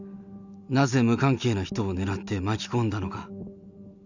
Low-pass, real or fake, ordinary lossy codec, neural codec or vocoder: 7.2 kHz; real; none; none